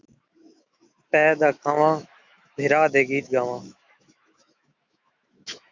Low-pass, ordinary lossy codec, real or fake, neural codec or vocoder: 7.2 kHz; Opus, 64 kbps; fake; autoencoder, 48 kHz, 128 numbers a frame, DAC-VAE, trained on Japanese speech